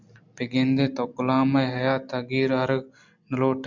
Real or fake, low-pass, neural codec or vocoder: real; 7.2 kHz; none